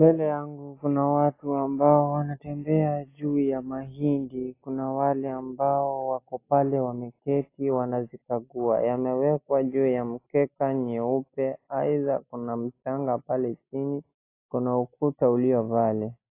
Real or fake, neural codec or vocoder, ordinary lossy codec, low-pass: real; none; AAC, 24 kbps; 3.6 kHz